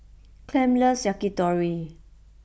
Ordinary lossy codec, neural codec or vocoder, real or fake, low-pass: none; none; real; none